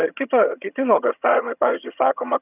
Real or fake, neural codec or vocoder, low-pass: fake; vocoder, 22.05 kHz, 80 mel bands, HiFi-GAN; 3.6 kHz